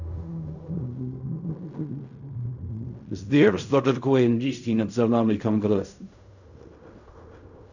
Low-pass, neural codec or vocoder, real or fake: 7.2 kHz; codec, 16 kHz in and 24 kHz out, 0.4 kbps, LongCat-Audio-Codec, fine tuned four codebook decoder; fake